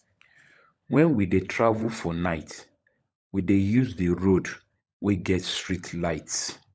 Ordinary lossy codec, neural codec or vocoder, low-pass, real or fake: none; codec, 16 kHz, 16 kbps, FunCodec, trained on LibriTTS, 50 frames a second; none; fake